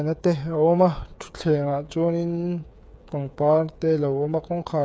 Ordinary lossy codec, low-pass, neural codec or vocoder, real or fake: none; none; codec, 16 kHz, 8 kbps, FreqCodec, smaller model; fake